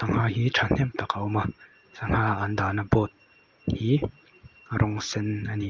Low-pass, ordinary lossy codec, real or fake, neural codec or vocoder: 7.2 kHz; Opus, 24 kbps; real; none